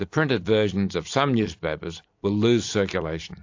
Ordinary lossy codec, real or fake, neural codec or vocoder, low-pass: AAC, 48 kbps; fake; vocoder, 44.1 kHz, 128 mel bands every 256 samples, BigVGAN v2; 7.2 kHz